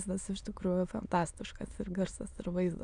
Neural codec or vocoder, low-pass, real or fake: autoencoder, 22.05 kHz, a latent of 192 numbers a frame, VITS, trained on many speakers; 9.9 kHz; fake